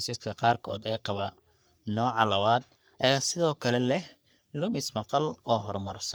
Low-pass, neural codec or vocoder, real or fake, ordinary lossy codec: none; codec, 44.1 kHz, 3.4 kbps, Pupu-Codec; fake; none